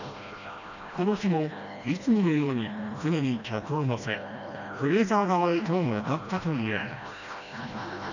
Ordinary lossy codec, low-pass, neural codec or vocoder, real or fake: none; 7.2 kHz; codec, 16 kHz, 1 kbps, FreqCodec, smaller model; fake